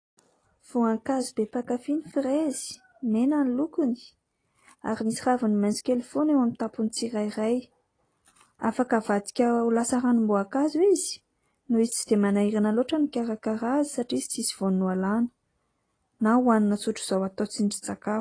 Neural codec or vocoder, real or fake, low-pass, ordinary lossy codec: none; real; 9.9 kHz; AAC, 32 kbps